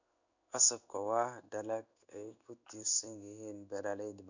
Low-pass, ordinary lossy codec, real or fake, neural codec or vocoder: 7.2 kHz; none; fake; codec, 16 kHz in and 24 kHz out, 1 kbps, XY-Tokenizer